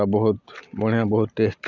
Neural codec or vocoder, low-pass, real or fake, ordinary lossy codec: none; 7.2 kHz; real; none